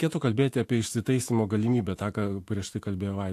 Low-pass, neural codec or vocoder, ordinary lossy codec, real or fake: 14.4 kHz; autoencoder, 48 kHz, 128 numbers a frame, DAC-VAE, trained on Japanese speech; AAC, 64 kbps; fake